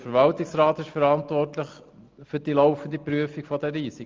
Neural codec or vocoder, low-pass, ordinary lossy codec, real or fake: none; 7.2 kHz; Opus, 32 kbps; real